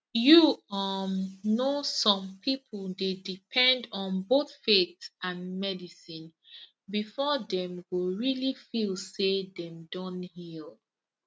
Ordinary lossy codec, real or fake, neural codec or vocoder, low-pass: none; real; none; none